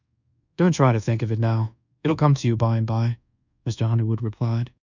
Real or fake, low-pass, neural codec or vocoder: fake; 7.2 kHz; codec, 24 kHz, 1.2 kbps, DualCodec